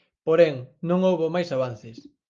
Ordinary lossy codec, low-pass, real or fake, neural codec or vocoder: Opus, 32 kbps; 7.2 kHz; real; none